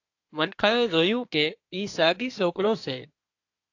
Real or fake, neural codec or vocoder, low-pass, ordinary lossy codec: fake; codec, 24 kHz, 1 kbps, SNAC; 7.2 kHz; AAC, 48 kbps